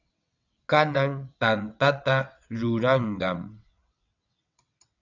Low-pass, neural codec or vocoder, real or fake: 7.2 kHz; vocoder, 22.05 kHz, 80 mel bands, WaveNeXt; fake